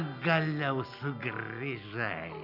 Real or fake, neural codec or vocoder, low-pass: real; none; 5.4 kHz